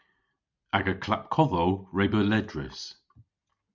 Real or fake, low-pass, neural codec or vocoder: real; 7.2 kHz; none